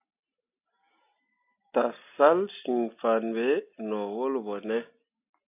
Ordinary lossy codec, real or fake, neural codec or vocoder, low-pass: AAC, 32 kbps; real; none; 3.6 kHz